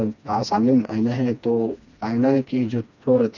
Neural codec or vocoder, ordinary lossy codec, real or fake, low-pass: codec, 16 kHz, 2 kbps, FreqCodec, smaller model; none; fake; 7.2 kHz